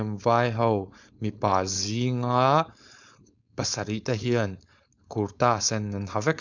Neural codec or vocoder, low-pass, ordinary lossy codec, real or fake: codec, 16 kHz, 4.8 kbps, FACodec; 7.2 kHz; none; fake